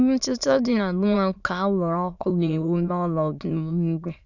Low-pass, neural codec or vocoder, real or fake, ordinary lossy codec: 7.2 kHz; autoencoder, 22.05 kHz, a latent of 192 numbers a frame, VITS, trained on many speakers; fake; none